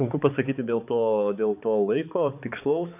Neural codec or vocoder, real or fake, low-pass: codec, 16 kHz, 4 kbps, X-Codec, HuBERT features, trained on balanced general audio; fake; 3.6 kHz